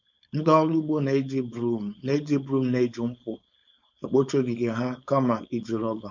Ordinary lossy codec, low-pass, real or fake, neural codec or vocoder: none; 7.2 kHz; fake; codec, 16 kHz, 4.8 kbps, FACodec